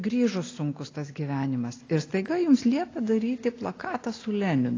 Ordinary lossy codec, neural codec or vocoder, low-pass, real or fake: AAC, 32 kbps; none; 7.2 kHz; real